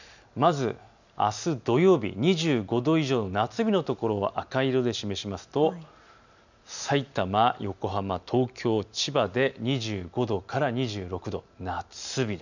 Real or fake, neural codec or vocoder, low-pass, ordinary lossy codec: real; none; 7.2 kHz; none